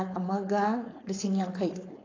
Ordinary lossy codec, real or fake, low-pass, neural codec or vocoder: AAC, 32 kbps; fake; 7.2 kHz; codec, 16 kHz, 4.8 kbps, FACodec